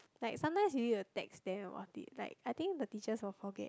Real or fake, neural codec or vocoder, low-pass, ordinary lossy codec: real; none; none; none